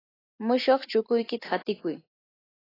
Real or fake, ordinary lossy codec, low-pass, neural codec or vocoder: real; AAC, 24 kbps; 5.4 kHz; none